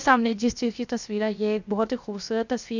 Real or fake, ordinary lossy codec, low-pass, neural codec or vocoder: fake; none; 7.2 kHz; codec, 16 kHz, 0.7 kbps, FocalCodec